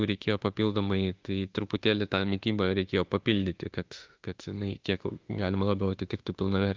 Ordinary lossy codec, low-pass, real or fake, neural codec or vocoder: Opus, 24 kbps; 7.2 kHz; fake; autoencoder, 48 kHz, 32 numbers a frame, DAC-VAE, trained on Japanese speech